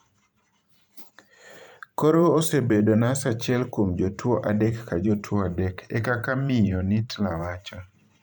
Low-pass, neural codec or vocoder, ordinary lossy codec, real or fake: 19.8 kHz; vocoder, 44.1 kHz, 128 mel bands every 512 samples, BigVGAN v2; none; fake